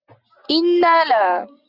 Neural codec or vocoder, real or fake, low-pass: none; real; 5.4 kHz